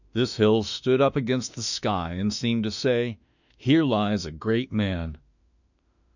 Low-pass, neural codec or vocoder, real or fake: 7.2 kHz; autoencoder, 48 kHz, 32 numbers a frame, DAC-VAE, trained on Japanese speech; fake